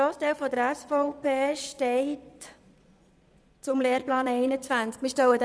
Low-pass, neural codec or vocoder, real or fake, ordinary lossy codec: none; vocoder, 22.05 kHz, 80 mel bands, Vocos; fake; none